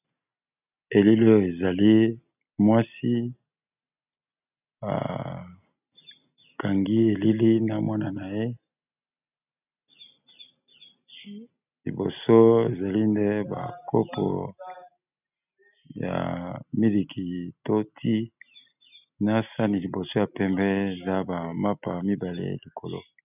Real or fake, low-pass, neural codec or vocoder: real; 3.6 kHz; none